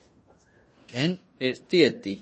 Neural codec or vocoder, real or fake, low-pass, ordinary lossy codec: codec, 16 kHz in and 24 kHz out, 0.9 kbps, LongCat-Audio-Codec, fine tuned four codebook decoder; fake; 10.8 kHz; MP3, 32 kbps